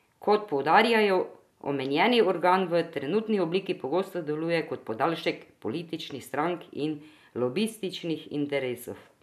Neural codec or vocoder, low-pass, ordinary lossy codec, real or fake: none; 14.4 kHz; none; real